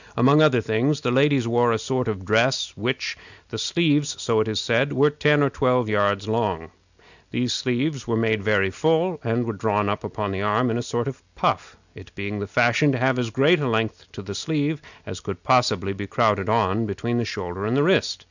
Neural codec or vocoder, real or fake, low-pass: none; real; 7.2 kHz